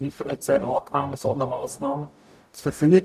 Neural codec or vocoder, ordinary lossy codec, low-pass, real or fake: codec, 44.1 kHz, 0.9 kbps, DAC; none; 14.4 kHz; fake